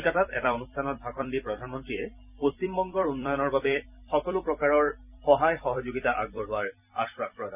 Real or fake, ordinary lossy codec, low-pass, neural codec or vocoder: fake; none; 3.6 kHz; vocoder, 44.1 kHz, 128 mel bands every 256 samples, BigVGAN v2